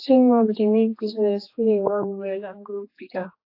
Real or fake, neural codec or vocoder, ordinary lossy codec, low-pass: fake; codec, 16 kHz, 2 kbps, X-Codec, HuBERT features, trained on general audio; AAC, 32 kbps; 5.4 kHz